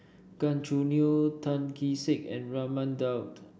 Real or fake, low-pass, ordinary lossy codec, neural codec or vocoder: real; none; none; none